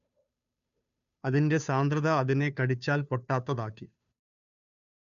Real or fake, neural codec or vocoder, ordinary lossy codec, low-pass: fake; codec, 16 kHz, 2 kbps, FunCodec, trained on Chinese and English, 25 frames a second; none; 7.2 kHz